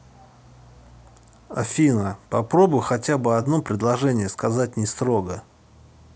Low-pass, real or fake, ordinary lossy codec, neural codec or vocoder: none; real; none; none